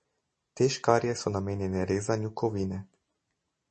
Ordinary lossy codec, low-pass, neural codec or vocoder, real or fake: MP3, 32 kbps; 9.9 kHz; none; real